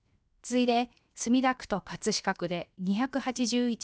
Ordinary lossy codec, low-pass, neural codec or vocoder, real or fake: none; none; codec, 16 kHz, 0.7 kbps, FocalCodec; fake